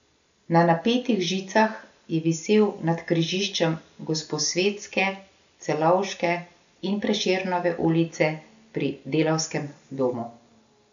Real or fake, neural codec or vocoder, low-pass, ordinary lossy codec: real; none; 7.2 kHz; none